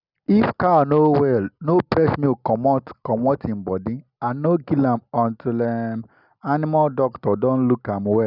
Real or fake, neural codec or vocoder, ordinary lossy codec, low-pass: real; none; none; 5.4 kHz